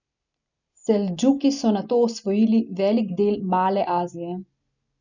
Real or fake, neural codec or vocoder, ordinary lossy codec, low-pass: real; none; none; 7.2 kHz